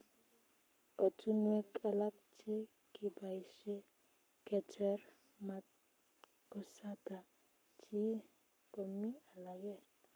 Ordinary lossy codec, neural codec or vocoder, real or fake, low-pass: none; codec, 44.1 kHz, 7.8 kbps, Pupu-Codec; fake; none